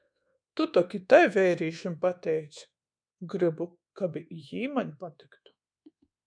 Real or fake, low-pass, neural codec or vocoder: fake; 9.9 kHz; codec, 24 kHz, 1.2 kbps, DualCodec